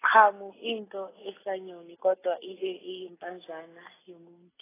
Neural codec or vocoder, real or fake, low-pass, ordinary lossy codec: none; real; 3.6 kHz; AAC, 16 kbps